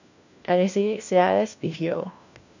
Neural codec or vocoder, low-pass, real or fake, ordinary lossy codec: codec, 16 kHz, 1 kbps, FunCodec, trained on LibriTTS, 50 frames a second; 7.2 kHz; fake; none